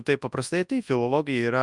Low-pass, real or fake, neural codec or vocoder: 10.8 kHz; fake; codec, 24 kHz, 0.9 kbps, WavTokenizer, large speech release